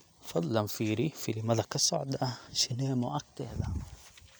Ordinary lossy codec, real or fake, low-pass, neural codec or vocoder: none; real; none; none